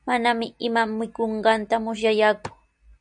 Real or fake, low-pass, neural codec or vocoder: real; 9.9 kHz; none